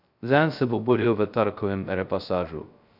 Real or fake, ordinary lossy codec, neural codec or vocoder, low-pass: fake; none; codec, 16 kHz, 0.2 kbps, FocalCodec; 5.4 kHz